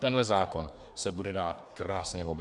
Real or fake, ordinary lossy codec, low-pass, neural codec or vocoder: fake; Opus, 64 kbps; 10.8 kHz; codec, 24 kHz, 1 kbps, SNAC